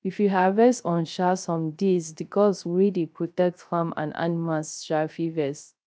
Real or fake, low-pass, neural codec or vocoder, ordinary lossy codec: fake; none; codec, 16 kHz, 0.3 kbps, FocalCodec; none